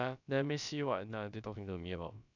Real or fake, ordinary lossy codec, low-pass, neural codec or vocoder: fake; none; 7.2 kHz; codec, 16 kHz, about 1 kbps, DyCAST, with the encoder's durations